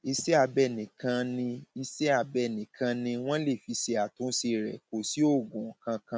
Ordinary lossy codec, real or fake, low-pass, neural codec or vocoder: none; real; none; none